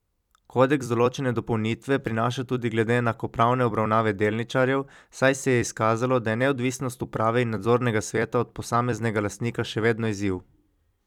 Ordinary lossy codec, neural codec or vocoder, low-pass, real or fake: none; vocoder, 44.1 kHz, 128 mel bands every 256 samples, BigVGAN v2; 19.8 kHz; fake